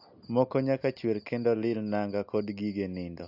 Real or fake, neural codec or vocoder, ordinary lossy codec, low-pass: real; none; AAC, 48 kbps; 5.4 kHz